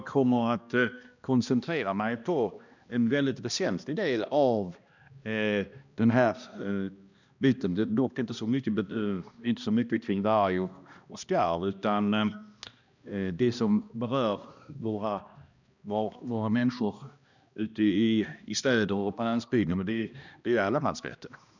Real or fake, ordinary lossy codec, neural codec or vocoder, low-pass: fake; none; codec, 16 kHz, 1 kbps, X-Codec, HuBERT features, trained on balanced general audio; 7.2 kHz